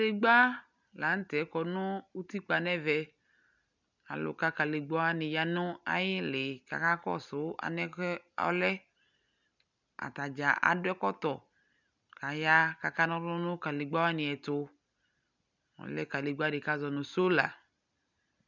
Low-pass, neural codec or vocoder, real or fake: 7.2 kHz; none; real